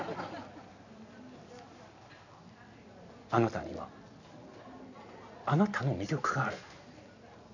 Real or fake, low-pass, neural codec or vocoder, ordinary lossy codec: real; 7.2 kHz; none; none